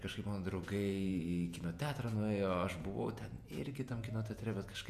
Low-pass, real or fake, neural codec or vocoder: 14.4 kHz; fake; vocoder, 48 kHz, 128 mel bands, Vocos